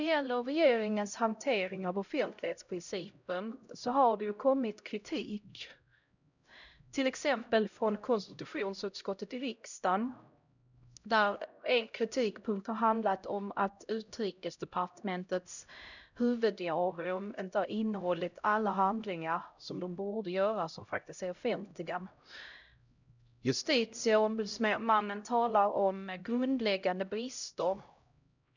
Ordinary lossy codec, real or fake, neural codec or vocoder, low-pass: none; fake; codec, 16 kHz, 0.5 kbps, X-Codec, HuBERT features, trained on LibriSpeech; 7.2 kHz